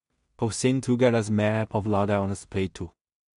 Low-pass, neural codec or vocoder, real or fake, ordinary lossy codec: 10.8 kHz; codec, 16 kHz in and 24 kHz out, 0.4 kbps, LongCat-Audio-Codec, two codebook decoder; fake; MP3, 64 kbps